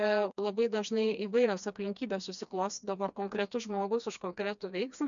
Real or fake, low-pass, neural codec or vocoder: fake; 7.2 kHz; codec, 16 kHz, 2 kbps, FreqCodec, smaller model